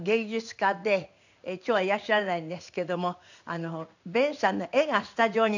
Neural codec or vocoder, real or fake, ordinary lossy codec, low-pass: codec, 16 kHz in and 24 kHz out, 1 kbps, XY-Tokenizer; fake; none; 7.2 kHz